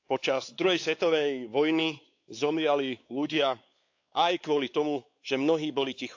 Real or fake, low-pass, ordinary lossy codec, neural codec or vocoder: fake; 7.2 kHz; AAC, 48 kbps; codec, 16 kHz, 4 kbps, X-Codec, WavLM features, trained on Multilingual LibriSpeech